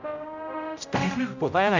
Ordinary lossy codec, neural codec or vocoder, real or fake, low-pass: none; codec, 16 kHz, 0.5 kbps, X-Codec, HuBERT features, trained on general audio; fake; 7.2 kHz